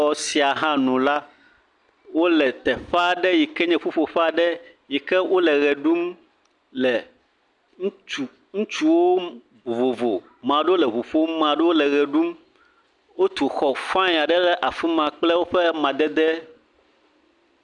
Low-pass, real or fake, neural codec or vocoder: 10.8 kHz; real; none